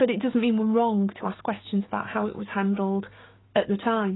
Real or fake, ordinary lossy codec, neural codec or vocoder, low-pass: fake; AAC, 16 kbps; codec, 16 kHz, 6 kbps, DAC; 7.2 kHz